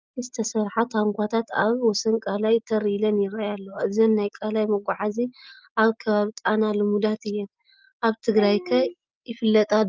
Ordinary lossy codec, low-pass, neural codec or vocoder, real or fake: Opus, 24 kbps; 7.2 kHz; none; real